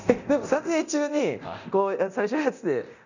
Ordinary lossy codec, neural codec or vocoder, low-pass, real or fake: none; codec, 24 kHz, 0.9 kbps, DualCodec; 7.2 kHz; fake